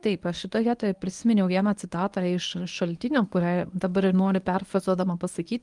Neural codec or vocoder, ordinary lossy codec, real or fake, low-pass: codec, 24 kHz, 0.9 kbps, WavTokenizer, medium speech release version 1; Opus, 32 kbps; fake; 10.8 kHz